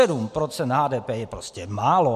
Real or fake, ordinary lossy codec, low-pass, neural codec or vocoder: real; MP3, 64 kbps; 14.4 kHz; none